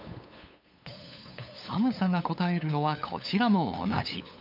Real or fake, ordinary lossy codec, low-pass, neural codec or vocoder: fake; MP3, 48 kbps; 5.4 kHz; codec, 16 kHz, 8 kbps, FunCodec, trained on LibriTTS, 25 frames a second